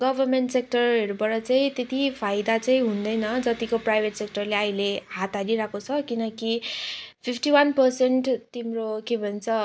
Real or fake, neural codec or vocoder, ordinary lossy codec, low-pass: real; none; none; none